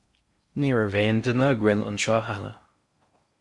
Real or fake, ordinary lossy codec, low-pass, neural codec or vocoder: fake; Opus, 64 kbps; 10.8 kHz; codec, 16 kHz in and 24 kHz out, 0.6 kbps, FocalCodec, streaming, 4096 codes